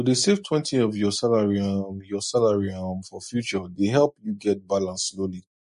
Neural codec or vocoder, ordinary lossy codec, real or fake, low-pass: none; MP3, 48 kbps; real; 10.8 kHz